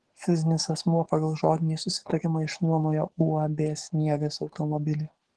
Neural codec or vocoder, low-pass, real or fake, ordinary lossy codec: codec, 44.1 kHz, 7.8 kbps, DAC; 10.8 kHz; fake; Opus, 16 kbps